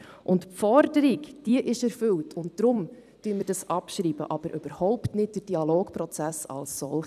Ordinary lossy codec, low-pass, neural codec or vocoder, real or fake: none; 14.4 kHz; vocoder, 44.1 kHz, 128 mel bands every 256 samples, BigVGAN v2; fake